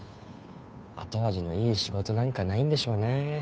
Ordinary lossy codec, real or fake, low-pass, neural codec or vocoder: none; fake; none; codec, 16 kHz, 2 kbps, FunCodec, trained on Chinese and English, 25 frames a second